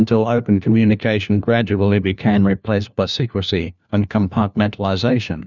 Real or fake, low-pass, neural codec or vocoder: fake; 7.2 kHz; codec, 16 kHz, 1 kbps, FunCodec, trained on LibriTTS, 50 frames a second